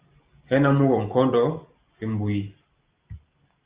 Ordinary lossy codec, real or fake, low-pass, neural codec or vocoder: Opus, 24 kbps; real; 3.6 kHz; none